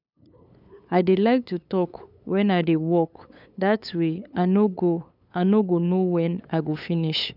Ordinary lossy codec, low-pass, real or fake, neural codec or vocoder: none; 5.4 kHz; fake; codec, 16 kHz, 8 kbps, FunCodec, trained on LibriTTS, 25 frames a second